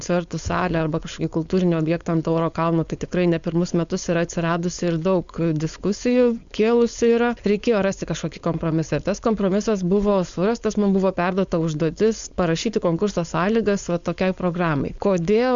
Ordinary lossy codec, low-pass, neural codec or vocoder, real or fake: Opus, 64 kbps; 7.2 kHz; codec, 16 kHz, 4.8 kbps, FACodec; fake